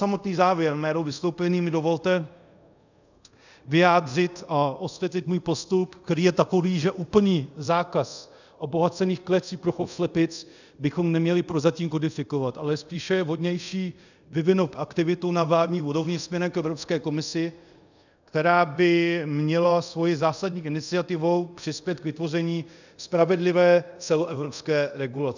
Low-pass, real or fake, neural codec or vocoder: 7.2 kHz; fake; codec, 24 kHz, 0.5 kbps, DualCodec